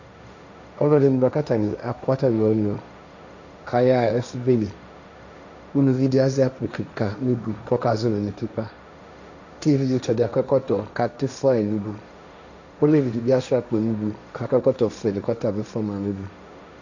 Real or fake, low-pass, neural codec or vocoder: fake; 7.2 kHz; codec, 16 kHz, 1.1 kbps, Voila-Tokenizer